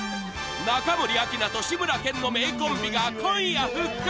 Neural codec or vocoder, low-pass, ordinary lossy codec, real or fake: none; none; none; real